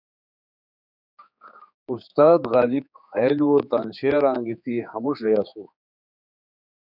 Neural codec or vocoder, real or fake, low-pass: codec, 16 kHz, 4 kbps, X-Codec, HuBERT features, trained on general audio; fake; 5.4 kHz